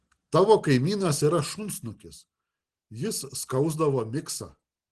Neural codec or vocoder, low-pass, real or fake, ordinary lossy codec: none; 10.8 kHz; real; Opus, 16 kbps